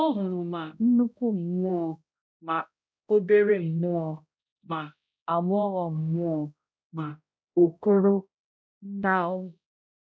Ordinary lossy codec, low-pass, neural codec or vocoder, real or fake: none; none; codec, 16 kHz, 0.5 kbps, X-Codec, HuBERT features, trained on balanced general audio; fake